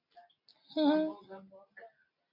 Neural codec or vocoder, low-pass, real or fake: none; 5.4 kHz; real